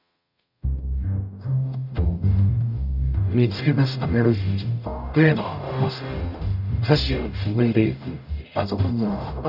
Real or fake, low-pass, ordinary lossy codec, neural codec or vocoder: fake; 5.4 kHz; none; codec, 44.1 kHz, 0.9 kbps, DAC